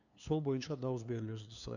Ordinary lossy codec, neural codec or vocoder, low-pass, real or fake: none; codec, 16 kHz, 2 kbps, FunCodec, trained on LibriTTS, 25 frames a second; 7.2 kHz; fake